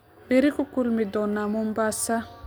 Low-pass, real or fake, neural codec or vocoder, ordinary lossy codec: none; real; none; none